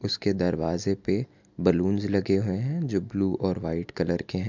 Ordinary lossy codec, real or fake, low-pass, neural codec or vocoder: MP3, 64 kbps; real; 7.2 kHz; none